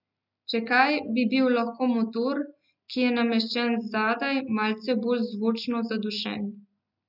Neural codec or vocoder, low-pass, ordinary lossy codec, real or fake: none; 5.4 kHz; none; real